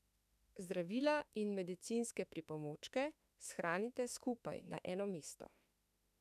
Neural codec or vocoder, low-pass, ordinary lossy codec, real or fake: autoencoder, 48 kHz, 32 numbers a frame, DAC-VAE, trained on Japanese speech; 14.4 kHz; none; fake